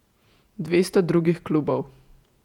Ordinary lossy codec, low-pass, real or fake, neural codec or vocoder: none; 19.8 kHz; fake; vocoder, 48 kHz, 128 mel bands, Vocos